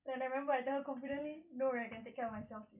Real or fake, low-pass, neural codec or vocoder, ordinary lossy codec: real; 3.6 kHz; none; none